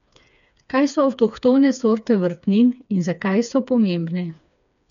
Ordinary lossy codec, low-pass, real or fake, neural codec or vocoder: none; 7.2 kHz; fake; codec, 16 kHz, 4 kbps, FreqCodec, smaller model